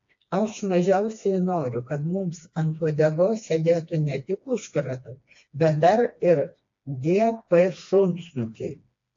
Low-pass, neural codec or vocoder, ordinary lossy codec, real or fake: 7.2 kHz; codec, 16 kHz, 2 kbps, FreqCodec, smaller model; AAC, 48 kbps; fake